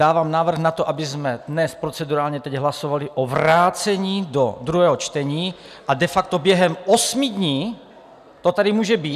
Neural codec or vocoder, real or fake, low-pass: none; real; 14.4 kHz